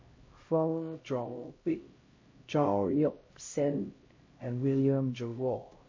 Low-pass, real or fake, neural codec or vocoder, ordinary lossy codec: 7.2 kHz; fake; codec, 16 kHz, 0.5 kbps, X-Codec, HuBERT features, trained on LibriSpeech; MP3, 32 kbps